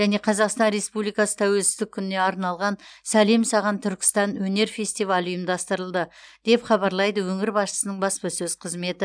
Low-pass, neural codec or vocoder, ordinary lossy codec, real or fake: 9.9 kHz; none; none; real